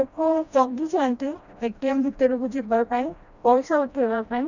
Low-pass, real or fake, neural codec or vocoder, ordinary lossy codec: 7.2 kHz; fake; codec, 16 kHz, 1 kbps, FreqCodec, smaller model; none